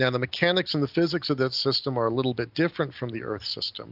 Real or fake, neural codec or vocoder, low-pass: real; none; 5.4 kHz